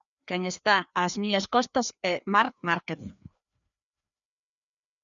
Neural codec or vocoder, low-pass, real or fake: codec, 16 kHz, 2 kbps, FreqCodec, larger model; 7.2 kHz; fake